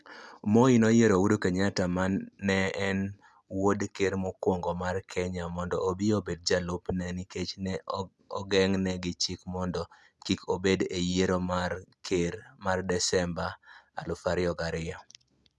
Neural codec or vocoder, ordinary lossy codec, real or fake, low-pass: none; none; real; none